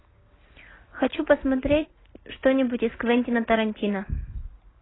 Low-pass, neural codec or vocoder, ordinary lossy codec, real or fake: 7.2 kHz; none; AAC, 16 kbps; real